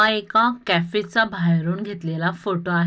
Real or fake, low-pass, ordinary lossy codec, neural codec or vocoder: real; none; none; none